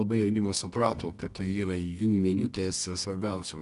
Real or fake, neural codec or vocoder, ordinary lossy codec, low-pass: fake; codec, 24 kHz, 0.9 kbps, WavTokenizer, medium music audio release; MP3, 96 kbps; 10.8 kHz